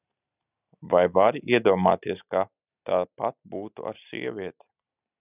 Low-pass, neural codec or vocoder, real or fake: 3.6 kHz; none; real